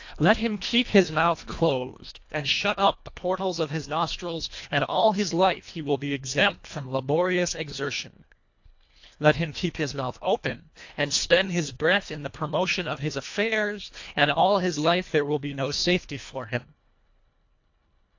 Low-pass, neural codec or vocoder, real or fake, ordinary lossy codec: 7.2 kHz; codec, 24 kHz, 1.5 kbps, HILCodec; fake; AAC, 48 kbps